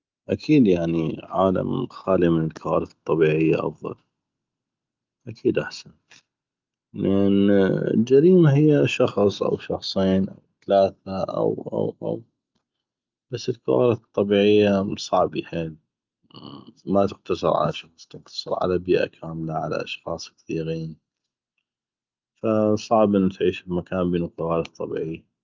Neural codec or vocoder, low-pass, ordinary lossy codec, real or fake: none; 7.2 kHz; Opus, 24 kbps; real